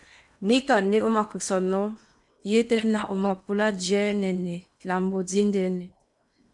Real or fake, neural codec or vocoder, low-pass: fake; codec, 16 kHz in and 24 kHz out, 0.8 kbps, FocalCodec, streaming, 65536 codes; 10.8 kHz